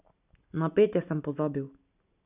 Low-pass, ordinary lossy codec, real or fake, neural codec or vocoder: 3.6 kHz; none; real; none